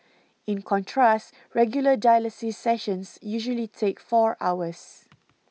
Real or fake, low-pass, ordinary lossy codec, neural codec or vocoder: real; none; none; none